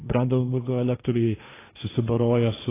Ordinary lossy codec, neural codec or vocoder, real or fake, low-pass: AAC, 16 kbps; codec, 16 kHz, 1.1 kbps, Voila-Tokenizer; fake; 3.6 kHz